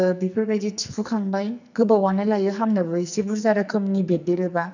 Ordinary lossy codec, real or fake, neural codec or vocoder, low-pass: none; fake; codec, 44.1 kHz, 2.6 kbps, SNAC; 7.2 kHz